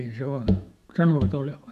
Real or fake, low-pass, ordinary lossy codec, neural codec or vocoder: fake; 14.4 kHz; none; autoencoder, 48 kHz, 128 numbers a frame, DAC-VAE, trained on Japanese speech